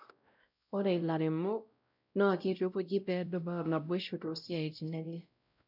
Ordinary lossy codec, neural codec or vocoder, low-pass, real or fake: none; codec, 16 kHz, 0.5 kbps, X-Codec, WavLM features, trained on Multilingual LibriSpeech; 5.4 kHz; fake